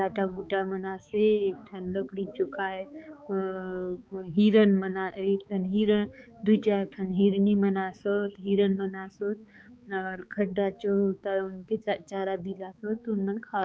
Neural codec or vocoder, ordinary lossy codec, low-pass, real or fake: codec, 16 kHz, 4 kbps, X-Codec, HuBERT features, trained on balanced general audio; none; none; fake